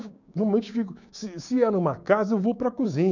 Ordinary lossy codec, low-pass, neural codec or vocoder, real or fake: none; 7.2 kHz; codec, 16 kHz, 6 kbps, DAC; fake